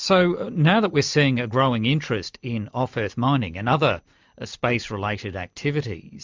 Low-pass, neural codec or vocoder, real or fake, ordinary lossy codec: 7.2 kHz; none; real; MP3, 64 kbps